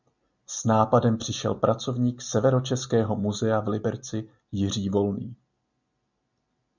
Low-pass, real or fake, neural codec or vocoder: 7.2 kHz; real; none